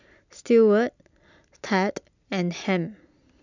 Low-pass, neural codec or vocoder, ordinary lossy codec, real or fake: 7.2 kHz; none; none; real